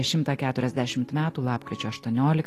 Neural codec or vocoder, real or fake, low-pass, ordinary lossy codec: none; real; 14.4 kHz; AAC, 48 kbps